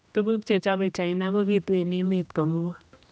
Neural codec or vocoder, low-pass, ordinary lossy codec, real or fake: codec, 16 kHz, 1 kbps, X-Codec, HuBERT features, trained on general audio; none; none; fake